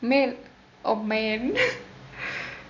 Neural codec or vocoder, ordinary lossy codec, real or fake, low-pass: none; none; real; 7.2 kHz